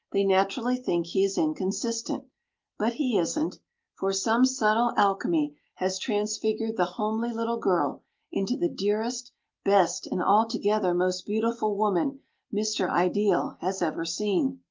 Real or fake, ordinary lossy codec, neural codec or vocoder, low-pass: real; Opus, 32 kbps; none; 7.2 kHz